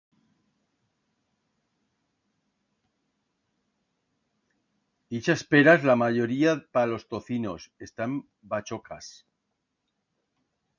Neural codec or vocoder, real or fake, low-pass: none; real; 7.2 kHz